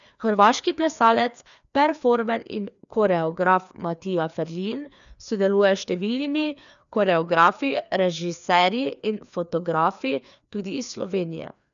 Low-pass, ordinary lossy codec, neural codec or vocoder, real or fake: 7.2 kHz; none; codec, 16 kHz, 2 kbps, FreqCodec, larger model; fake